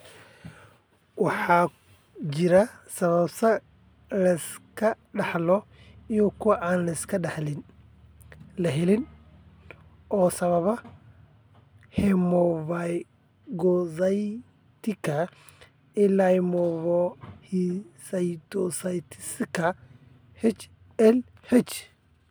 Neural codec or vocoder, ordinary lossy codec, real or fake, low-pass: none; none; real; none